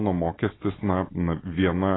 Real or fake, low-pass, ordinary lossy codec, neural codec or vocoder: real; 7.2 kHz; AAC, 16 kbps; none